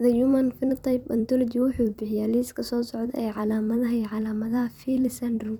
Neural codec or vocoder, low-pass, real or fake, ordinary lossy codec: vocoder, 44.1 kHz, 128 mel bands every 256 samples, BigVGAN v2; 19.8 kHz; fake; none